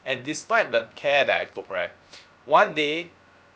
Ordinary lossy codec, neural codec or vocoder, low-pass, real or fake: none; codec, 16 kHz, about 1 kbps, DyCAST, with the encoder's durations; none; fake